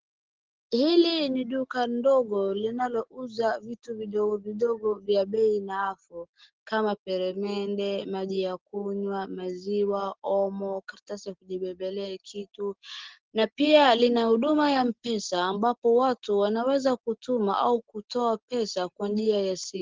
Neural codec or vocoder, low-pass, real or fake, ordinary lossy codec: none; 7.2 kHz; real; Opus, 16 kbps